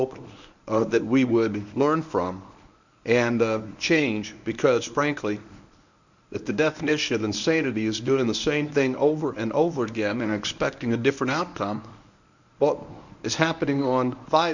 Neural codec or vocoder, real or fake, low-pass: codec, 24 kHz, 0.9 kbps, WavTokenizer, medium speech release version 1; fake; 7.2 kHz